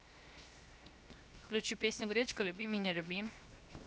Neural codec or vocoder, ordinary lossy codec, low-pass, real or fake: codec, 16 kHz, 0.7 kbps, FocalCodec; none; none; fake